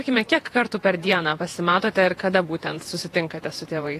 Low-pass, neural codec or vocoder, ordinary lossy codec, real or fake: 14.4 kHz; vocoder, 48 kHz, 128 mel bands, Vocos; AAC, 48 kbps; fake